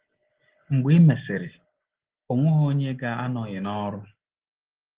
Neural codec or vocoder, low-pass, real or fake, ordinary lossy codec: none; 3.6 kHz; real; Opus, 16 kbps